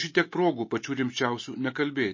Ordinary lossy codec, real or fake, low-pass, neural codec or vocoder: MP3, 32 kbps; real; 7.2 kHz; none